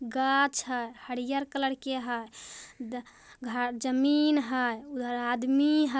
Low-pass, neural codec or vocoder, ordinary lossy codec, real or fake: none; none; none; real